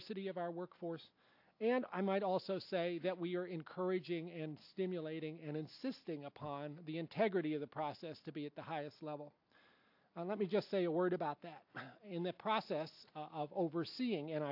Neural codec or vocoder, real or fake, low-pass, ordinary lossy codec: none; real; 5.4 kHz; AAC, 48 kbps